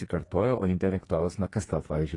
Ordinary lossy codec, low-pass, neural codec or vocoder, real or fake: AAC, 32 kbps; 10.8 kHz; codec, 32 kHz, 1.9 kbps, SNAC; fake